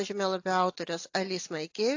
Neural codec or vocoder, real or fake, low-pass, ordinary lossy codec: none; real; 7.2 kHz; AAC, 48 kbps